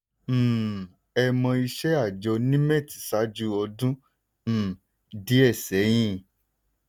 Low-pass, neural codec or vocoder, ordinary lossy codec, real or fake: none; none; none; real